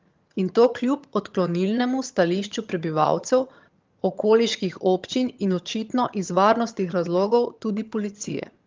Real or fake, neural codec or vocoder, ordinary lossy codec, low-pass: fake; vocoder, 22.05 kHz, 80 mel bands, HiFi-GAN; Opus, 32 kbps; 7.2 kHz